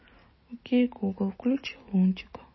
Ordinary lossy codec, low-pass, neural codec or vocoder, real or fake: MP3, 24 kbps; 7.2 kHz; none; real